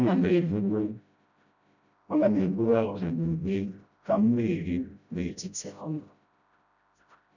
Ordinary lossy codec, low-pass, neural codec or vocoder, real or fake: none; 7.2 kHz; codec, 16 kHz, 0.5 kbps, FreqCodec, smaller model; fake